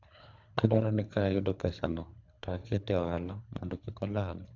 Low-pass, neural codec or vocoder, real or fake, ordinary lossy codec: 7.2 kHz; codec, 24 kHz, 3 kbps, HILCodec; fake; none